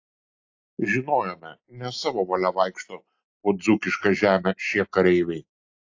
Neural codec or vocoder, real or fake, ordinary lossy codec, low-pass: none; real; AAC, 48 kbps; 7.2 kHz